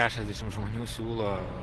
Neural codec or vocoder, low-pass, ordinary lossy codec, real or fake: vocoder, 24 kHz, 100 mel bands, Vocos; 10.8 kHz; Opus, 16 kbps; fake